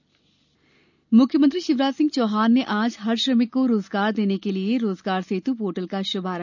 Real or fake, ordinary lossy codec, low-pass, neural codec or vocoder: real; none; 7.2 kHz; none